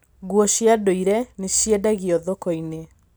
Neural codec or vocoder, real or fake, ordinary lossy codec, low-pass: none; real; none; none